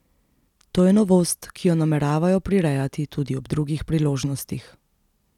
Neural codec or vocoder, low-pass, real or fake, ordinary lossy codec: none; 19.8 kHz; real; none